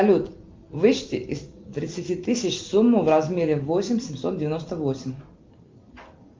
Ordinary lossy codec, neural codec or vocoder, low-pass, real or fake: Opus, 24 kbps; none; 7.2 kHz; real